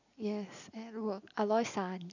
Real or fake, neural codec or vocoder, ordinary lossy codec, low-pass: real; none; none; 7.2 kHz